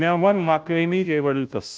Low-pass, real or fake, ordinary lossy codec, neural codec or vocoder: none; fake; none; codec, 16 kHz, 0.5 kbps, FunCodec, trained on Chinese and English, 25 frames a second